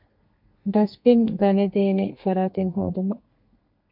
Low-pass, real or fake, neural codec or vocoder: 5.4 kHz; fake; codec, 32 kHz, 1.9 kbps, SNAC